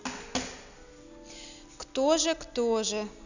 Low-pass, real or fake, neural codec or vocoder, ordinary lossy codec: 7.2 kHz; real; none; none